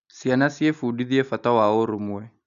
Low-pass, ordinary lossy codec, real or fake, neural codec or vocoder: 7.2 kHz; none; real; none